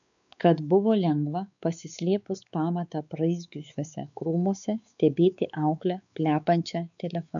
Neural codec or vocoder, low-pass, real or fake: codec, 16 kHz, 4 kbps, X-Codec, WavLM features, trained on Multilingual LibriSpeech; 7.2 kHz; fake